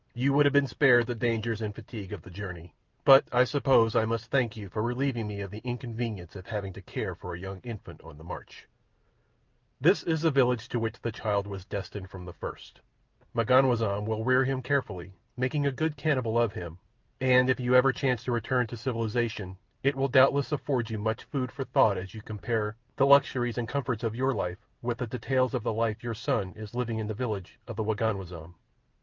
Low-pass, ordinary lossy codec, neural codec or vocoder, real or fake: 7.2 kHz; Opus, 24 kbps; none; real